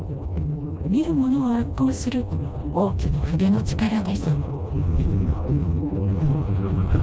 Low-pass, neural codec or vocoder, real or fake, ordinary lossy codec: none; codec, 16 kHz, 1 kbps, FreqCodec, smaller model; fake; none